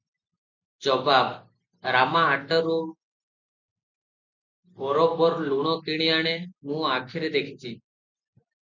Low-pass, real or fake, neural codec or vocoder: 7.2 kHz; real; none